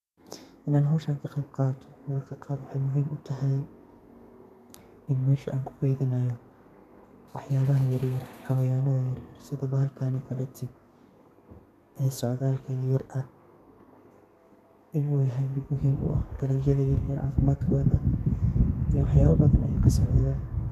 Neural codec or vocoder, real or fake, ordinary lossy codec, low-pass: codec, 32 kHz, 1.9 kbps, SNAC; fake; none; 14.4 kHz